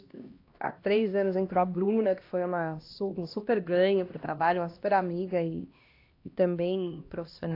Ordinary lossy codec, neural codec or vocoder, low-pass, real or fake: AAC, 32 kbps; codec, 16 kHz, 1 kbps, X-Codec, HuBERT features, trained on LibriSpeech; 5.4 kHz; fake